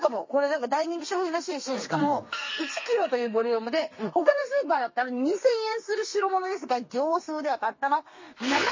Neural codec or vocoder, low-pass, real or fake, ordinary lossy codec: codec, 32 kHz, 1.9 kbps, SNAC; 7.2 kHz; fake; MP3, 32 kbps